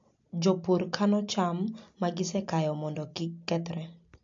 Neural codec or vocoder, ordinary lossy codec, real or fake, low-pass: none; AAC, 48 kbps; real; 7.2 kHz